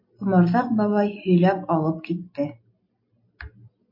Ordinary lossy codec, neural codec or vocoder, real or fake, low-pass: MP3, 32 kbps; none; real; 5.4 kHz